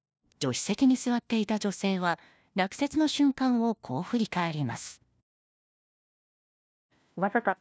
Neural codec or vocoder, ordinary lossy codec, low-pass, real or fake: codec, 16 kHz, 1 kbps, FunCodec, trained on LibriTTS, 50 frames a second; none; none; fake